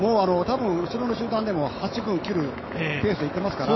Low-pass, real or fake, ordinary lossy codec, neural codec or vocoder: 7.2 kHz; fake; MP3, 24 kbps; autoencoder, 48 kHz, 128 numbers a frame, DAC-VAE, trained on Japanese speech